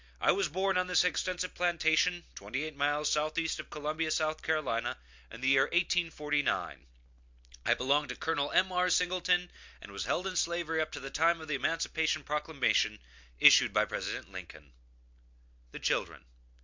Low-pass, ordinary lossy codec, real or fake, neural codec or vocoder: 7.2 kHz; MP3, 64 kbps; real; none